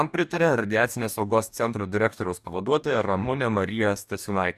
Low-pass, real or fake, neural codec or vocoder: 14.4 kHz; fake; codec, 44.1 kHz, 2.6 kbps, DAC